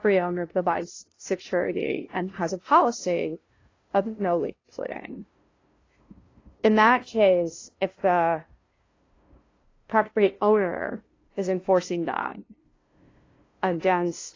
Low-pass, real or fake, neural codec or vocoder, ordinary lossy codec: 7.2 kHz; fake; codec, 16 kHz, 0.5 kbps, FunCodec, trained on LibriTTS, 25 frames a second; AAC, 32 kbps